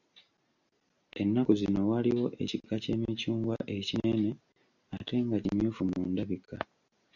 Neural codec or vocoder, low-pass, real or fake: none; 7.2 kHz; real